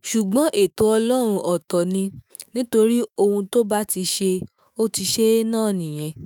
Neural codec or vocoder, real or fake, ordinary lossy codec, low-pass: autoencoder, 48 kHz, 128 numbers a frame, DAC-VAE, trained on Japanese speech; fake; none; none